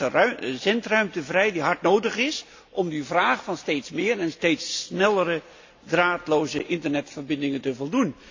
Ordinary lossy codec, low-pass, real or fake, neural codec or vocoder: AAC, 48 kbps; 7.2 kHz; real; none